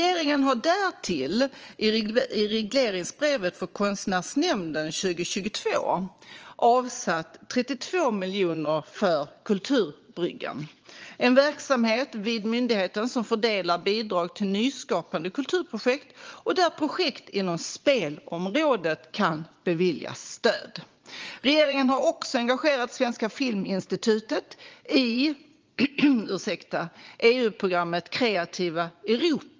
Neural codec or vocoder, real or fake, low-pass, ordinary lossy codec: vocoder, 22.05 kHz, 80 mel bands, Vocos; fake; 7.2 kHz; Opus, 24 kbps